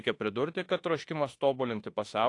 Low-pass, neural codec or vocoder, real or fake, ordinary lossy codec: 10.8 kHz; autoencoder, 48 kHz, 32 numbers a frame, DAC-VAE, trained on Japanese speech; fake; AAC, 48 kbps